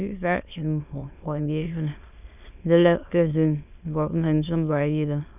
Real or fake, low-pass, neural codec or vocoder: fake; 3.6 kHz; autoencoder, 22.05 kHz, a latent of 192 numbers a frame, VITS, trained on many speakers